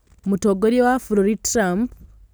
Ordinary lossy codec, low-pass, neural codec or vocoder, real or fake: none; none; none; real